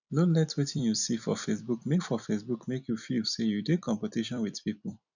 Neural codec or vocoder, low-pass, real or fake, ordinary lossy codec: vocoder, 44.1 kHz, 128 mel bands every 256 samples, BigVGAN v2; 7.2 kHz; fake; none